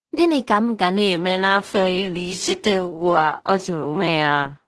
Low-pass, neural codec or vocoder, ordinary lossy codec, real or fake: 10.8 kHz; codec, 16 kHz in and 24 kHz out, 0.4 kbps, LongCat-Audio-Codec, two codebook decoder; Opus, 16 kbps; fake